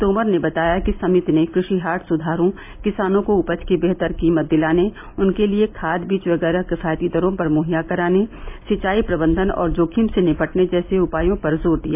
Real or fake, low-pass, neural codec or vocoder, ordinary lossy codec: real; 3.6 kHz; none; MP3, 32 kbps